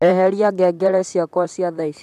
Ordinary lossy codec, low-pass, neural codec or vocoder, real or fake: none; 14.4 kHz; vocoder, 44.1 kHz, 128 mel bands, Pupu-Vocoder; fake